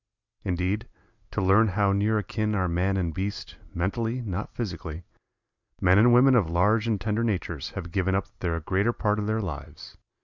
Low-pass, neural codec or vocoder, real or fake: 7.2 kHz; none; real